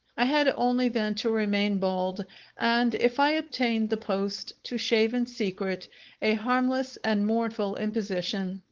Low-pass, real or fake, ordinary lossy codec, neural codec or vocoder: 7.2 kHz; fake; Opus, 16 kbps; codec, 16 kHz, 4.8 kbps, FACodec